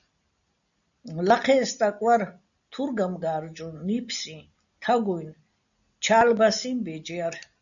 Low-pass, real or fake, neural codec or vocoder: 7.2 kHz; real; none